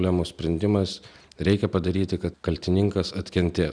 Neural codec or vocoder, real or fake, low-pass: none; real; 9.9 kHz